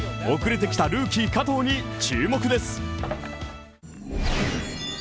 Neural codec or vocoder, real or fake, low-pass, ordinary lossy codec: none; real; none; none